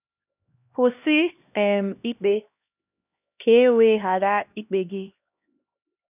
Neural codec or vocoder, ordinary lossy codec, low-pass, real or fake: codec, 16 kHz, 1 kbps, X-Codec, HuBERT features, trained on LibriSpeech; none; 3.6 kHz; fake